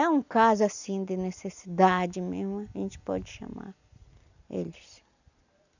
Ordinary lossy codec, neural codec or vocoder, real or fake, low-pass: none; none; real; 7.2 kHz